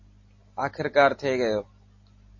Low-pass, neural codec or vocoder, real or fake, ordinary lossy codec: 7.2 kHz; none; real; MP3, 32 kbps